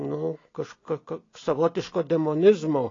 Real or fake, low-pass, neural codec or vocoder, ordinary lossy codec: real; 7.2 kHz; none; AAC, 32 kbps